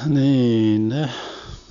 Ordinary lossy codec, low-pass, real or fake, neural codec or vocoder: none; 7.2 kHz; real; none